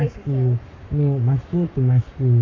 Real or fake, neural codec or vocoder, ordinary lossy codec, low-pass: fake; codec, 44.1 kHz, 7.8 kbps, Pupu-Codec; MP3, 48 kbps; 7.2 kHz